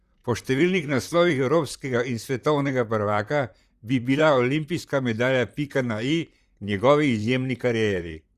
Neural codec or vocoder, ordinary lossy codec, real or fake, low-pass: vocoder, 44.1 kHz, 128 mel bands, Pupu-Vocoder; Opus, 64 kbps; fake; 14.4 kHz